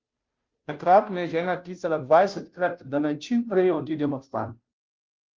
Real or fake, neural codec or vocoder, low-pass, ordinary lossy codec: fake; codec, 16 kHz, 0.5 kbps, FunCodec, trained on Chinese and English, 25 frames a second; 7.2 kHz; Opus, 32 kbps